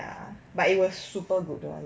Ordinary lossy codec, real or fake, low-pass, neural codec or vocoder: none; real; none; none